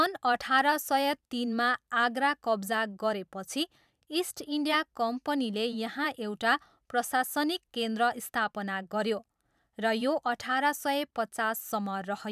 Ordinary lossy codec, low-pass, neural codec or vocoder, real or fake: none; 14.4 kHz; vocoder, 44.1 kHz, 128 mel bands every 512 samples, BigVGAN v2; fake